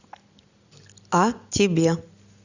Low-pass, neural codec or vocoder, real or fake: 7.2 kHz; none; real